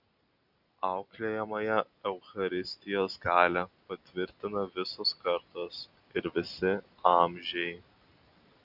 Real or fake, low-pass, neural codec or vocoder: real; 5.4 kHz; none